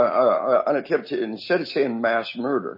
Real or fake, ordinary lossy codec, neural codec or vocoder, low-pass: real; MP3, 24 kbps; none; 5.4 kHz